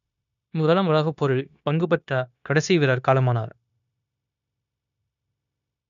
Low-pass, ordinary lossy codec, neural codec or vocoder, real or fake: 7.2 kHz; none; codec, 16 kHz, 0.9 kbps, LongCat-Audio-Codec; fake